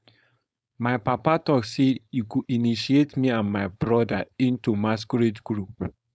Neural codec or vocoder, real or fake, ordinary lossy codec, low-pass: codec, 16 kHz, 4.8 kbps, FACodec; fake; none; none